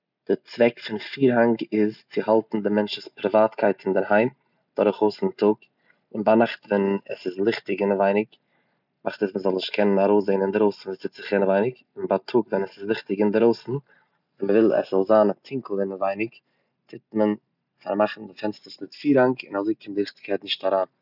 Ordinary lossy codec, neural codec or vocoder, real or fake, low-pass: none; none; real; 5.4 kHz